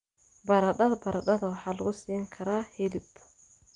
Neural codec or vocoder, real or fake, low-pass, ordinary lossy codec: none; real; 10.8 kHz; Opus, 32 kbps